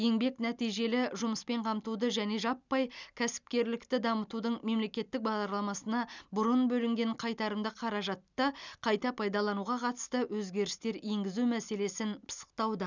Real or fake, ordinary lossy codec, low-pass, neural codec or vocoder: real; none; 7.2 kHz; none